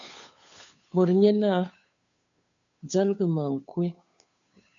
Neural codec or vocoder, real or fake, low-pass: codec, 16 kHz, 2 kbps, FunCodec, trained on Chinese and English, 25 frames a second; fake; 7.2 kHz